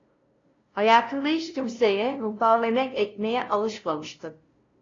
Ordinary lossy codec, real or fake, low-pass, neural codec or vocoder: AAC, 32 kbps; fake; 7.2 kHz; codec, 16 kHz, 0.5 kbps, FunCodec, trained on LibriTTS, 25 frames a second